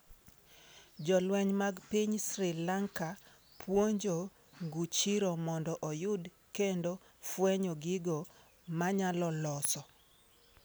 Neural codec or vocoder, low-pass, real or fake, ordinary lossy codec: none; none; real; none